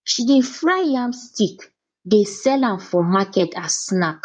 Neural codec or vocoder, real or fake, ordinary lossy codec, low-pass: codec, 16 kHz in and 24 kHz out, 2.2 kbps, FireRedTTS-2 codec; fake; none; 9.9 kHz